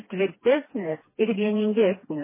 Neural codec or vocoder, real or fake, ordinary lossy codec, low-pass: codec, 16 kHz, 2 kbps, FreqCodec, smaller model; fake; MP3, 16 kbps; 3.6 kHz